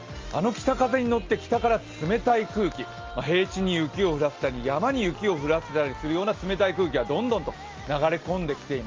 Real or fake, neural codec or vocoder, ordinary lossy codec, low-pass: real; none; Opus, 32 kbps; 7.2 kHz